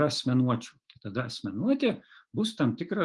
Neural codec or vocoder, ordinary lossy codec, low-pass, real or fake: autoencoder, 48 kHz, 128 numbers a frame, DAC-VAE, trained on Japanese speech; Opus, 24 kbps; 10.8 kHz; fake